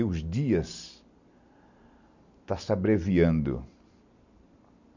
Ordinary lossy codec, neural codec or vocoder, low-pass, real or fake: none; none; 7.2 kHz; real